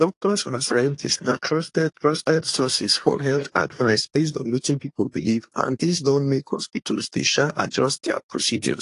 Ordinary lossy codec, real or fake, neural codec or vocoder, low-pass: AAC, 48 kbps; fake; codec, 24 kHz, 1 kbps, SNAC; 10.8 kHz